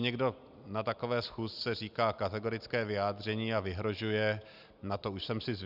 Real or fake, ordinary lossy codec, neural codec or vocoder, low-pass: real; Opus, 64 kbps; none; 5.4 kHz